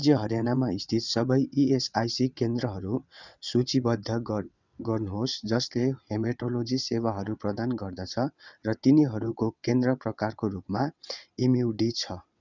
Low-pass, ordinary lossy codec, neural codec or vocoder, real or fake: 7.2 kHz; none; vocoder, 22.05 kHz, 80 mel bands, WaveNeXt; fake